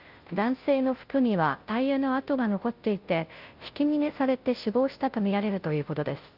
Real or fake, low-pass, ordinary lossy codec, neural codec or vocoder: fake; 5.4 kHz; Opus, 32 kbps; codec, 16 kHz, 0.5 kbps, FunCodec, trained on Chinese and English, 25 frames a second